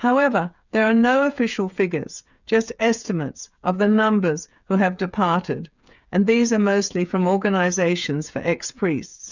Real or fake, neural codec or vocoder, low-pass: fake; codec, 16 kHz, 8 kbps, FreqCodec, smaller model; 7.2 kHz